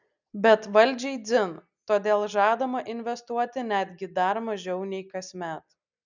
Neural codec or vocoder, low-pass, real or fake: none; 7.2 kHz; real